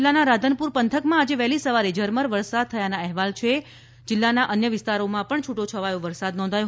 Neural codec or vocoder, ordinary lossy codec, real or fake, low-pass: none; none; real; none